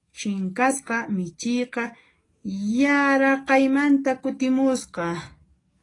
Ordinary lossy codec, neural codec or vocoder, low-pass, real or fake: AAC, 32 kbps; codec, 44.1 kHz, 7.8 kbps, DAC; 10.8 kHz; fake